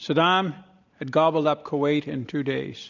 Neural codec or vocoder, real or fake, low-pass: none; real; 7.2 kHz